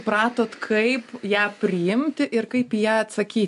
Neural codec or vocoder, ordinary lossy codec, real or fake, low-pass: none; MP3, 64 kbps; real; 10.8 kHz